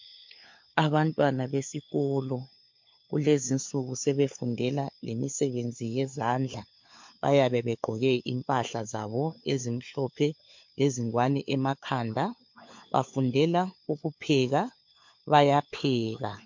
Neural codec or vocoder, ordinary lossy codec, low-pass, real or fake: codec, 16 kHz, 4 kbps, FunCodec, trained on LibriTTS, 50 frames a second; MP3, 48 kbps; 7.2 kHz; fake